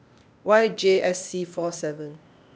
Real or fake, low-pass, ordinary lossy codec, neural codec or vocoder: fake; none; none; codec, 16 kHz, 0.8 kbps, ZipCodec